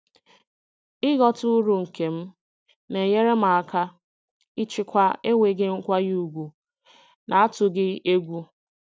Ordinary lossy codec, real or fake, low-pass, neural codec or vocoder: none; real; none; none